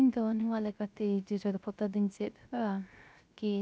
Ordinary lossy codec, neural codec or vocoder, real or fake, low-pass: none; codec, 16 kHz, 0.3 kbps, FocalCodec; fake; none